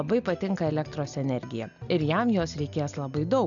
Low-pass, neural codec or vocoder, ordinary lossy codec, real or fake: 7.2 kHz; none; MP3, 96 kbps; real